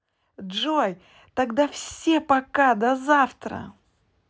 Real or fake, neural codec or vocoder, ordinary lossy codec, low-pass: real; none; none; none